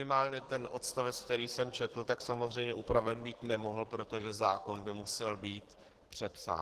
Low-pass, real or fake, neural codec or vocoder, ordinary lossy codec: 14.4 kHz; fake; codec, 44.1 kHz, 2.6 kbps, SNAC; Opus, 16 kbps